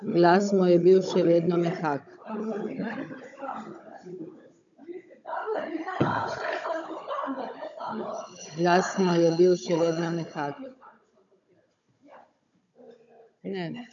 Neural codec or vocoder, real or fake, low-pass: codec, 16 kHz, 16 kbps, FunCodec, trained on Chinese and English, 50 frames a second; fake; 7.2 kHz